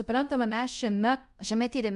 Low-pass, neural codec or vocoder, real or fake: 10.8 kHz; codec, 24 kHz, 0.5 kbps, DualCodec; fake